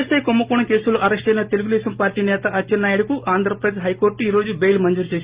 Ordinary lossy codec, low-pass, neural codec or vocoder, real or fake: Opus, 32 kbps; 3.6 kHz; none; real